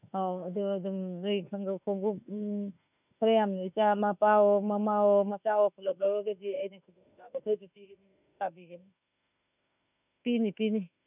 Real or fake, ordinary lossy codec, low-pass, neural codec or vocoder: fake; none; 3.6 kHz; autoencoder, 48 kHz, 32 numbers a frame, DAC-VAE, trained on Japanese speech